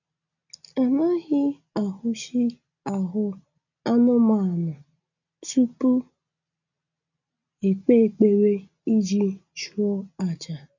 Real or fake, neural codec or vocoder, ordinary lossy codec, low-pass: real; none; none; 7.2 kHz